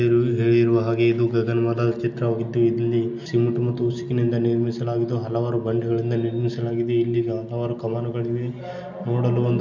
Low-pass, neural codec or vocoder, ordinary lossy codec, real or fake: 7.2 kHz; none; none; real